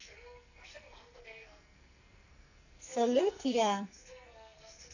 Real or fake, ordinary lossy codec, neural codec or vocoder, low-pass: fake; none; codec, 44.1 kHz, 2.6 kbps, SNAC; 7.2 kHz